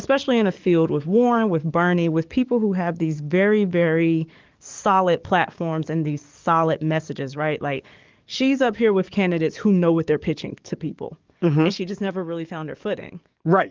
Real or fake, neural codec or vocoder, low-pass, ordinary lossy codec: fake; codec, 44.1 kHz, 7.8 kbps, DAC; 7.2 kHz; Opus, 32 kbps